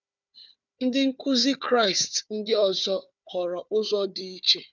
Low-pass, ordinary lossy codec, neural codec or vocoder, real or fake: 7.2 kHz; none; codec, 16 kHz, 4 kbps, FunCodec, trained on Chinese and English, 50 frames a second; fake